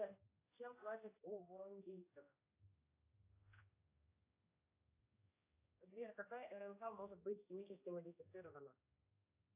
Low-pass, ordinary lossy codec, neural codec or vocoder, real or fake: 3.6 kHz; AAC, 24 kbps; codec, 16 kHz, 1 kbps, X-Codec, HuBERT features, trained on general audio; fake